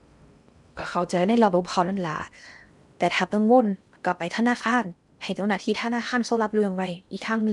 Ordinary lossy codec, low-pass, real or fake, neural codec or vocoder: none; 10.8 kHz; fake; codec, 16 kHz in and 24 kHz out, 0.6 kbps, FocalCodec, streaming, 4096 codes